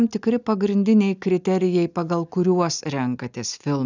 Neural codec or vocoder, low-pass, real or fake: none; 7.2 kHz; real